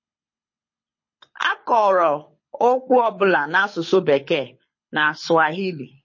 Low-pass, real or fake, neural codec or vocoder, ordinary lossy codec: 7.2 kHz; fake; codec, 24 kHz, 6 kbps, HILCodec; MP3, 32 kbps